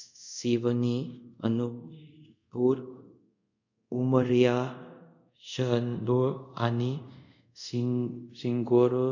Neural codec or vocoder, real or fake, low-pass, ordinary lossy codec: codec, 24 kHz, 0.5 kbps, DualCodec; fake; 7.2 kHz; none